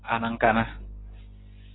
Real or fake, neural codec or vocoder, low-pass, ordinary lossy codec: real; none; 7.2 kHz; AAC, 16 kbps